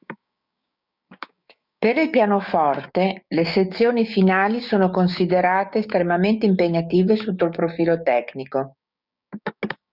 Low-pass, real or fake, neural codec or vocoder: 5.4 kHz; fake; codec, 16 kHz, 6 kbps, DAC